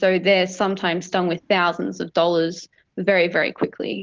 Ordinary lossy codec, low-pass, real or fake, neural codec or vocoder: Opus, 16 kbps; 7.2 kHz; real; none